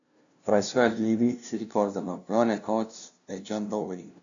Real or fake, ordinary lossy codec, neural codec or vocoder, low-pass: fake; MP3, 64 kbps; codec, 16 kHz, 0.5 kbps, FunCodec, trained on LibriTTS, 25 frames a second; 7.2 kHz